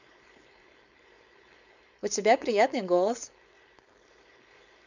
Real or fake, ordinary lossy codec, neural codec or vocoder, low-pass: fake; none; codec, 16 kHz, 4.8 kbps, FACodec; 7.2 kHz